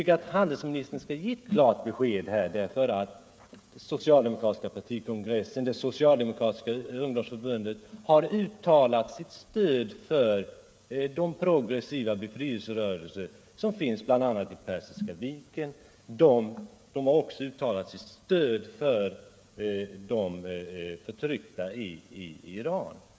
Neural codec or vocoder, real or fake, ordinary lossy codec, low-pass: codec, 16 kHz, 16 kbps, FreqCodec, smaller model; fake; none; none